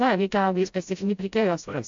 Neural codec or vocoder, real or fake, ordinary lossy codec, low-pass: codec, 16 kHz, 0.5 kbps, FreqCodec, smaller model; fake; AAC, 64 kbps; 7.2 kHz